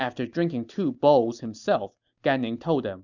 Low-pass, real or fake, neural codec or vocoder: 7.2 kHz; real; none